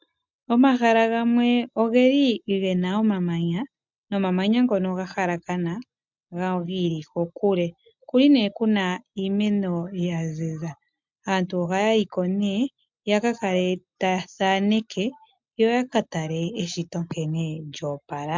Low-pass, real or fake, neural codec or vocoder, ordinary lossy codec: 7.2 kHz; real; none; MP3, 64 kbps